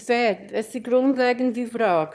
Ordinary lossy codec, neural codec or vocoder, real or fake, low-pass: none; autoencoder, 22.05 kHz, a latent of 192 numbers a frame, VITS, trained on one speaker; fake; none